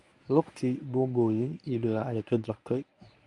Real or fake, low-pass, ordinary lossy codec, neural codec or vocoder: fake; 10.8 kHz; none; codec, 24 kHz, 0.9 kbps, WavTokenizer, medium speech release version 1